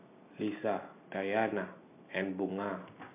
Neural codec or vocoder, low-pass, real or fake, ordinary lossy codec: none; 3.6 kHz; real; MP3, 24 kbps